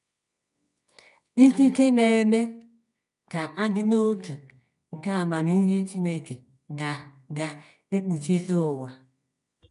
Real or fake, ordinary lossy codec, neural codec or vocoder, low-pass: fake; none; codec, 24 kHz, 0.9 kbps, WavTokenizer, medium music audio release; 10.8 kHz